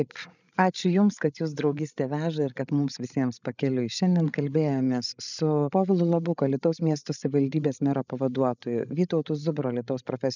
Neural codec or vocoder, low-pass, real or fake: codec, 16 kHz, 16 kbps, FreqCodec, larger model; 7.2 kHz; fake